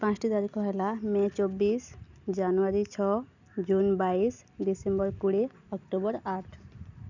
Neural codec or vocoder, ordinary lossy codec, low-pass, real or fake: none; none; 7.2 kHz; real